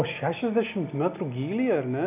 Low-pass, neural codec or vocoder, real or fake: 3.6 kHz; none; real